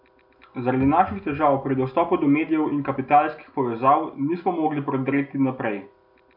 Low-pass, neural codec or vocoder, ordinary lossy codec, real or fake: 5.4 kHz; none; none; real